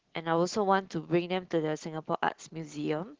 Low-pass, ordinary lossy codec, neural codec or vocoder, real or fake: 7.2 kHz; Opus, 16 kbps; none; real